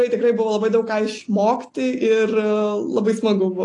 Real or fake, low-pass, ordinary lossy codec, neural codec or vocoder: real; 10.8 kHz; AAC, 48 kbps; none